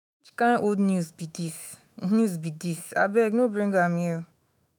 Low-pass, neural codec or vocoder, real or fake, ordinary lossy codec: none; autoencoder, 48 kHz, 128 numbers a frame, DAC-VAE, trained on Japanese speech; fake; none